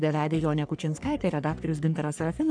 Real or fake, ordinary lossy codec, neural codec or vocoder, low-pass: fake; MP3, 96 kbps; codec, 44.1 kHz, 3.4 kbps, Pupu-Codec; 9.9 kHz